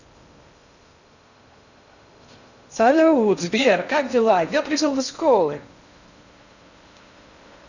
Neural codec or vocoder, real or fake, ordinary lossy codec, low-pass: codec, 16 kHz in and 24 kHz out, 0.6 kbps, FocalCodec, streaming, 2048 codes; fake; none; 7.2 kHz